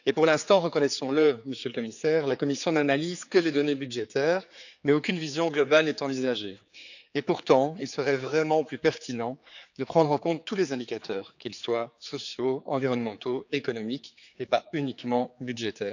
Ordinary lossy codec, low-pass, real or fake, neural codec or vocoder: none; 7.2 kHz; fake; codec, 16 kHz, 4 kbps, X-Codec, HuBERT features, trained on general audio